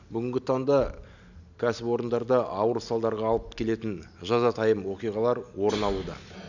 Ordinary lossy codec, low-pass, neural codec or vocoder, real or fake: none; 7.2 kHz; none; real